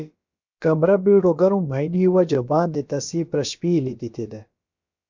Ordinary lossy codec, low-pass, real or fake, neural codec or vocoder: MP3, 64 kbps; 7.2 kHz; fake; codec, 16 kHz, about 1 kbps, DyCAST, with the encoder's durations